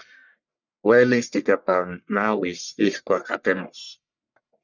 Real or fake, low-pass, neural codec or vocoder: fake; 7.2 kHz; codec, 44.1 kHz, 1.7 kbps, Pupu-Codec